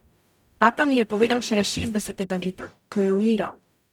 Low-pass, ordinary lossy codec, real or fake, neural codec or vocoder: 19.8 kHz; none; fake; codec, 44.1 kHz, 0.9 kbps, DAC